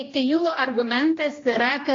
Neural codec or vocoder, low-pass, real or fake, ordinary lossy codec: codec, 16 kHz, 1 kbps, X-Codec, HuBERT features, trained on general audio; 7.2 kHz; fake; AAC, 32 kbps